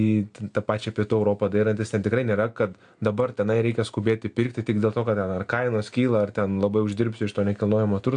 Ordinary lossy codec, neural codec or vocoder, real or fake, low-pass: AAC, 64 kbps; none; real; 9.9 kHz